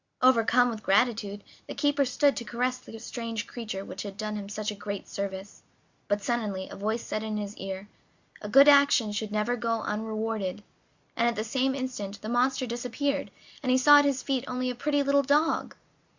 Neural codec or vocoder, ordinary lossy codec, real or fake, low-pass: none; Opus, 64 kbps; real; 7.2 kHz